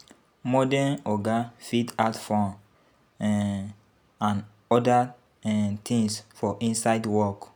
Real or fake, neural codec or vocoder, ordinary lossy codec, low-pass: real; none; none; none